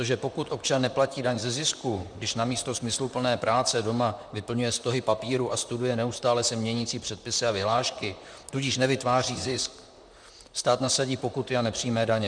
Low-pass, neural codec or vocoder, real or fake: 9.9 kHz; vocoder, 44.1 kHz, 128 mel bands, Pupu-Vocoder; fake